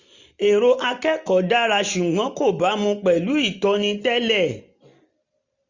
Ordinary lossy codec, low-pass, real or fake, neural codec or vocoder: none; 7.2 kHz; real; none